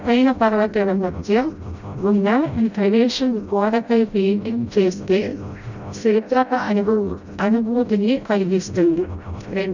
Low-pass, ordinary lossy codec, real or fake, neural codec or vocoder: 7.2 kHz; none; fake; codec, 16 kHz, 0.5 kbps, FreqCodec, smaller model